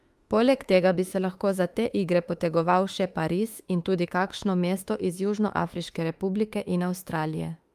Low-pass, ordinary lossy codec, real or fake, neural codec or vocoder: 14.4 kHz; Opus, 32 kbps; fake; autoencoder, 48 kHz, 32 numbers a frame, DAC-VAE, trained on Japanese speech